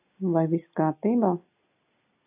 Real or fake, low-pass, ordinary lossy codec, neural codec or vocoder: real; 3.6 kHz; AAC, 32 kbps; none